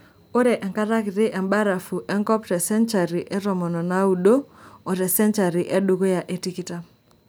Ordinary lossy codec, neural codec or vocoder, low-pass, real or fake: none; none; none; real